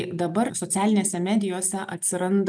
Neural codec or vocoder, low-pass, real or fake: none; 9.9 kHz; real